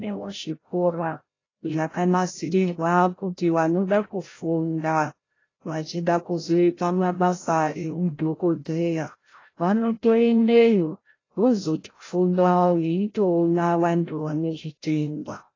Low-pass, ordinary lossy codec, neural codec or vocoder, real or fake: 7.2 kHz; AAC, 32 kbps; codec, 16 kHz, 0.5 kbps, FreqCodec, larger model; fake